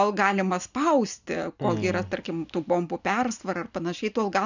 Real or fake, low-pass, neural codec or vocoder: real; 7.2 kHz; none